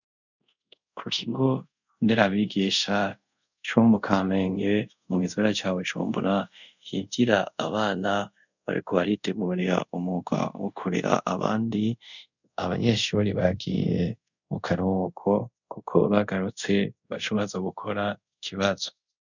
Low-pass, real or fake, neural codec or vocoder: 7.2 kHz; fake; codec, 24 kHz, 0.5 kbps, DualCodec